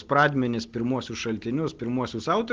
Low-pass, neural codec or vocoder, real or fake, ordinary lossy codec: 7.2 kHz; none; real; Opus, 16 kbps